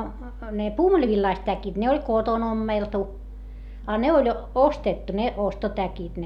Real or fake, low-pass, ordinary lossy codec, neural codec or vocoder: real; 19.8 kHz; none; none